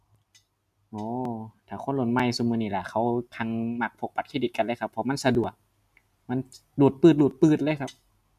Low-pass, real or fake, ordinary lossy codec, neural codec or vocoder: 14.4 kHz; real; none; none